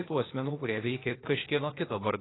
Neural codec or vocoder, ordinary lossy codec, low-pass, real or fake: codec, 16 kHz, 0.8 kbps, ZipCodec; AAC, 16 kbps; 7.2 kHz; fake